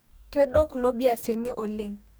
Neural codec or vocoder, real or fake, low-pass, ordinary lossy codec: codec, 44.1 kHz, 2.6 kbps, DAC; fake; none; none